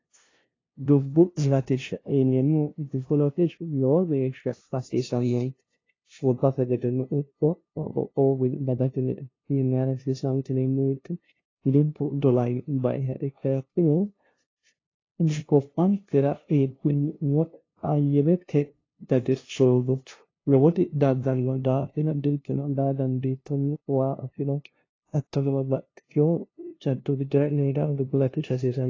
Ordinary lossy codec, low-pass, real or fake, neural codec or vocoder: AAC, 32 kbps; 7.2 kHz; fake; codec, 16 kHz, 0.5 kbps, FunCodec, trained on LibriTTS, 25 frames a second